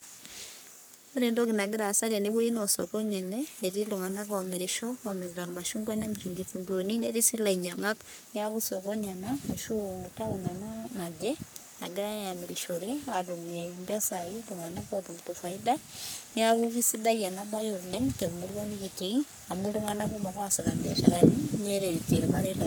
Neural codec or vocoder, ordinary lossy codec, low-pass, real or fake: codec, 44.1 kHz, 3.4 kbps, Pupu-Codec; none; none; fake